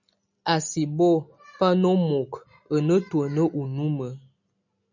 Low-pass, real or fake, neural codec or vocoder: 7.2 kHz; real; none